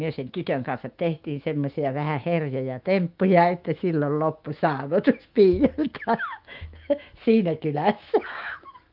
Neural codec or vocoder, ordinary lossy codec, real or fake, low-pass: autoencoder, 48 kHz, 128 numbers a frame, DAC-VAE, trained on Japanese speech; Opus, 32 kbps; fake; 5.4 kHz